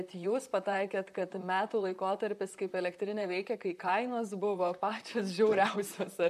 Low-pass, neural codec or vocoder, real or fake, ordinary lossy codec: 14.4 kHz; vocoder, 44.1 kHz, 128 mel bands, Pupu-Vocoder; fake; MP3, 96 kbps